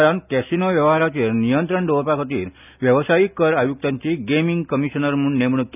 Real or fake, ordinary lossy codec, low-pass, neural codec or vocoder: real; none; 3.6 kHz; none